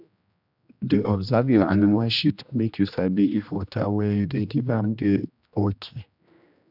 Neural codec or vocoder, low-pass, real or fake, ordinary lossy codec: codec, 16 kHz, 1 kbps, X-Codec, HuBERT features, trained on general audio; 5.4 kHz; fake; none